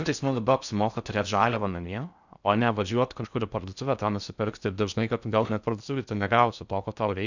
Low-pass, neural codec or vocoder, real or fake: 7.2 kHz; codec, 16 kHz in and 24 kHz out, 0.6 kbps, FocalCodec, streaming, 4096 codes; fake